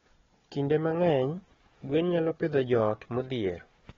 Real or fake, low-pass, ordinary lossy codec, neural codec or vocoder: fake; 7.2 kHz; AAC, 24 kbps; codec, 16 kHz, 4 kbps, FunCodec, trained on Chinese and English, 50 frames a second